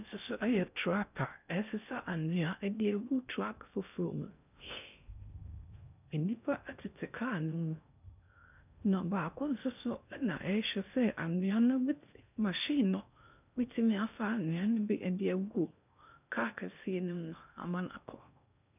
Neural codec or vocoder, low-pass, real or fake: codec, 16 kHz in and 24 kHz out, 0.6 kbps, FocalCodec, streaming, 2048 codes; 3.6 kHz; fake